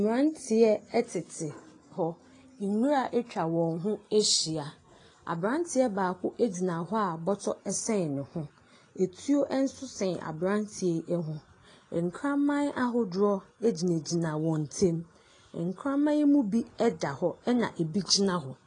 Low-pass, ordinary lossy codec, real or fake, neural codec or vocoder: 9.9 kHz; AAC, 32 kbps; real; none